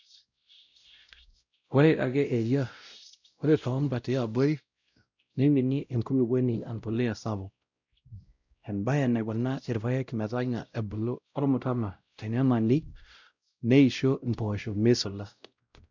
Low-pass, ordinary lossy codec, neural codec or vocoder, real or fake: 7.2 kHz; none; codec, 16 kHz, 0.5 kbps, X-Codec, WavLM features, trained on Multilingual LibriSpeech; fake